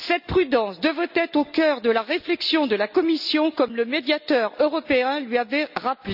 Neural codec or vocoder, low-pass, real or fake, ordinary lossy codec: none; 5.4 kHz; real; none